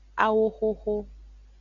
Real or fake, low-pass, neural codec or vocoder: real; 7.2 kHz; none